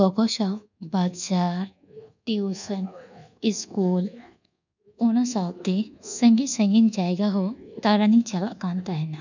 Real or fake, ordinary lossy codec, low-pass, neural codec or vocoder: fake; none; 7.2 kHz; codec, 24 kHz, 1.2 kbps, DualCodec